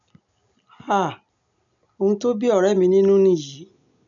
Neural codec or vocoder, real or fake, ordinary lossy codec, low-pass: none; real; none; 7.2 kHz